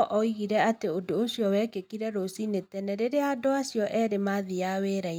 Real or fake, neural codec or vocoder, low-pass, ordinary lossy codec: real; none; 19.8 kHz; none